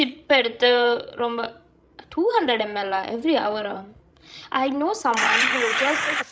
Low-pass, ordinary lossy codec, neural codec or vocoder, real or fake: none; none; codec, 16 kHz, 16 kbps, FreqCodec, larger model; fake